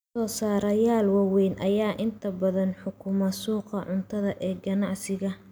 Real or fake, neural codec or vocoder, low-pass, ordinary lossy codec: real; none; none; none